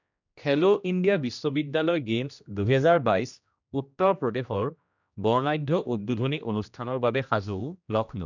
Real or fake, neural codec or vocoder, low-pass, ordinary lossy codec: fake; codec, 16 kHz, 1 kbps, X-Codec, HuBERT features, trained on general audio; 7.2 kHz; none